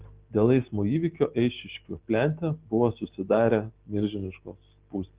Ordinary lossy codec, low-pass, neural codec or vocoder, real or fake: Opus, 16 kbps; 3.6 kHz; none; real